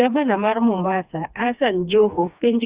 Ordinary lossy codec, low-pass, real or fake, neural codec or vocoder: Opus, 64 kbps; 3.6 kHz; fake; codec, 16 kHz, 2 kbps, FreqCodec, smaller model